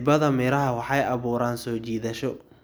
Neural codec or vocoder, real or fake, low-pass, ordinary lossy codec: none; real; none; none